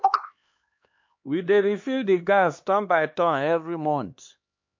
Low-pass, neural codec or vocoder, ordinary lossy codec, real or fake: 7.2 kHz; codec, 16 kHz, 2 kbps, X-Codec, HuBERT features, trained on LibriSpeech; MP3, 48 kbps; fake